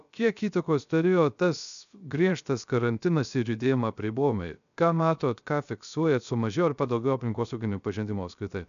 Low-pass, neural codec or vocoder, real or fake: 7.2 kHz; codec, 16 kHz, 0.3 kbps, FocalCodec; fake